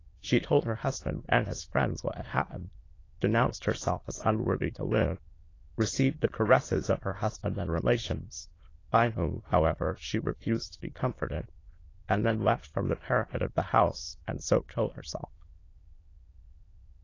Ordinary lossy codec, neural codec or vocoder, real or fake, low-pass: AAC, 32 kbps; autoencoder, 22.05 kHz, a latent of 192 numbers a frame, VITS, trained on many speakers; fake; 7.2 kHz